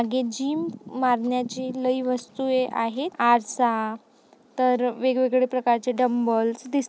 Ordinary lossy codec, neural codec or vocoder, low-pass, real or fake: none; none; none; real